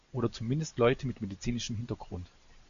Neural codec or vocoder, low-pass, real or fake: none; 7.2 kHz; real